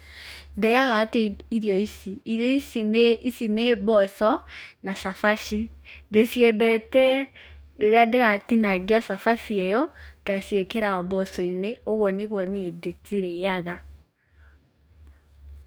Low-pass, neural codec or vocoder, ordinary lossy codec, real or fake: none; codec, 44.1 kHz, 2.6 kbps, DAC; none; fake